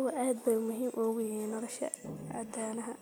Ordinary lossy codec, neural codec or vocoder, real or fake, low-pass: none; none; real; none